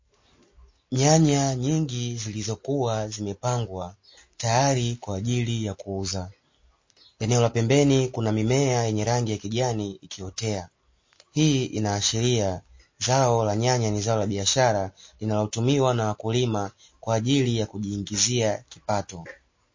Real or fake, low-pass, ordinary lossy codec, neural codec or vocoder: real; 7.2 kHz; MP3, 32 kbps; none